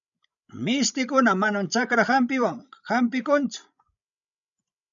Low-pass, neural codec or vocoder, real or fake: 7.2 kHz; codec, 16 kHz, 16 kbps, FreqCodec, larger model; fake